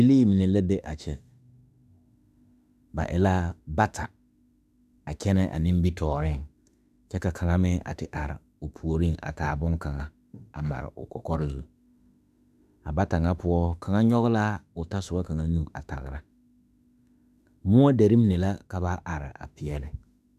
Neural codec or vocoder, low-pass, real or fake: autoencoder, 48 kHz, 32 numbers a frame, DAC-VAE, trained on Japanese speech; 14.4 kHz; fake